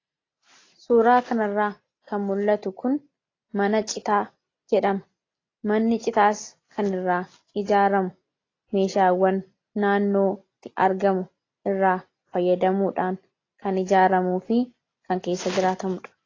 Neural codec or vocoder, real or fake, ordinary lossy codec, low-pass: none; real; AAC, 32 kbps; 7.2 kHz